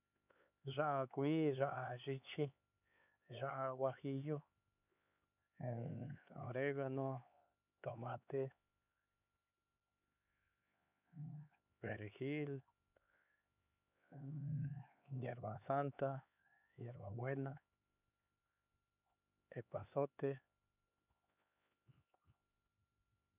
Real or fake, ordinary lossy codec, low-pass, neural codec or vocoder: fake; none; 3.6 kHz; codec, 16 kHz, 4 kbps, X-Codec, HuBERT features, trained on LibriSpeech